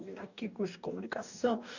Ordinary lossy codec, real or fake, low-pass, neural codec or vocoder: none; fake; 7.2 kHz; codec, 44.1 kHz, 2.6 kbps, DAC